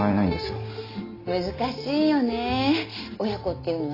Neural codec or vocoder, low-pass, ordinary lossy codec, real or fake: none; 5.4 kHz; AAC, 24 kbps; real